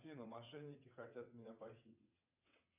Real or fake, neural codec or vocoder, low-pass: fake; vocoder, 22.05 kHz, 80 mel bands, WaveNeXt; 3.6 kHz